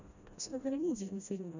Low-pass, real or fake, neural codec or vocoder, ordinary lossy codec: 7.2 kHz; fake; codec, 16 kHz, 1 kbps, FreqCodec, smaller model; none